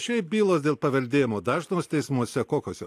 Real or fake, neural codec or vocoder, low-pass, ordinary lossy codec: real; none; 14.4 kHz; AAC, 64 kbps